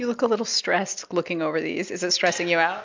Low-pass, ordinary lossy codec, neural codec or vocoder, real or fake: 7.2 kHz; MP3, 64 kbps; none; real